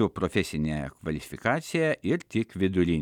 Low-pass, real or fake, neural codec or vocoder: 19.8 kHz; real; none